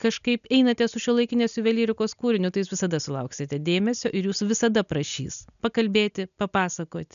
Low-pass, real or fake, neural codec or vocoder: 7.2 kHz; real; none